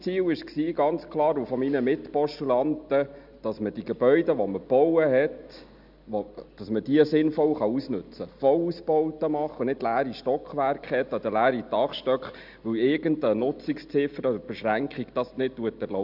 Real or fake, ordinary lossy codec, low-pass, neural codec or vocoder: real; none; 5.4 kHz; none